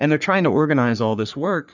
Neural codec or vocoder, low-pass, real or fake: codec, 44.1 kHz, 3.4 kbps, Pupu-Codec; 7.2 kHz; fake